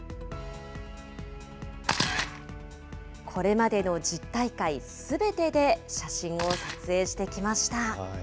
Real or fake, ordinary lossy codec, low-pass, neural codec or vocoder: real; none; none; none